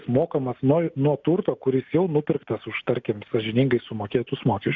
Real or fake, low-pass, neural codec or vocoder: real; 7.2 kHz; none